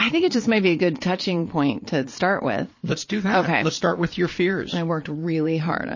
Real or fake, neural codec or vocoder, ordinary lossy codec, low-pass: fake; vocoder, 22.05 kHz, 80 mel bands, Vocos; MP3, 32 kbps; 7.2 kHz